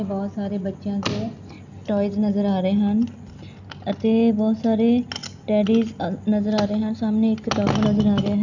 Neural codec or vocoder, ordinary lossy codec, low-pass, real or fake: none; none; 7.2 kHz; real